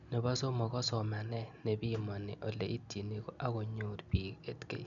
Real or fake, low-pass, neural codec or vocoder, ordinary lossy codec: real; 7.2 kHz; none; none